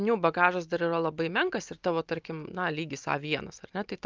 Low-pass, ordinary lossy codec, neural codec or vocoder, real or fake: 7.2 kHz; Opus, 24 kbps; none; real